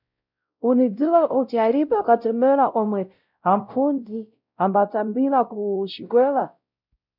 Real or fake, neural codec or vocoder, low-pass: fake; codec, 16 kHz, 0.5 kbps, X-Codec, WavLM features, trained on Multilingual LibriSpeech; 5.4 kHz